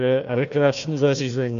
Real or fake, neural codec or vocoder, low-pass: fake; codec, 16 kHz, 1 kbps, FunCodec, trained on Chinese and English, 50 frames a second; 7.2 kHz